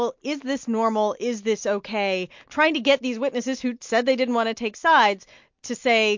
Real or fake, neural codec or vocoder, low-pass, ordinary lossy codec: real; none; 7.2 kHz; MP3, 48 kbps